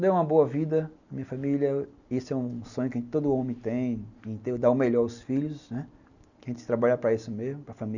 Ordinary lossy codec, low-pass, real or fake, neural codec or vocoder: none; 7.2 kHz; real; none